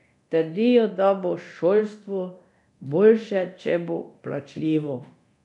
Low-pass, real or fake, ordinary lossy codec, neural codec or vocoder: 10.8 kHz; fake; none; codec, 24 kHz, 0.9 kbps, DualCodec